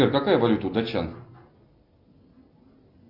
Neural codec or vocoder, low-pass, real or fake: none; 5.4 kHz; real